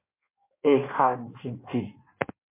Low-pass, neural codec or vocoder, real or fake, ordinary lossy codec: 3.6 kHz; codec, 16 kHz in and 24 kHz out, 1.1 kbps, FireRedTTS-2 codec; fake; MP3, 24 kbps